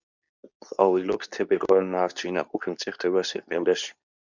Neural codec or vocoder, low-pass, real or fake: codec, 24 kHz, 0.9 kbps, WavTokenizer, medium speech release version 2; 7.2 kHz; fake